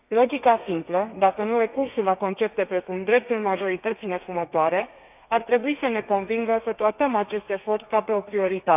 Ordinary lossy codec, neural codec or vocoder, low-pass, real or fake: none; codec, 32 kHz, 1.9 kbps, SNAC; 3.6 kHz; fake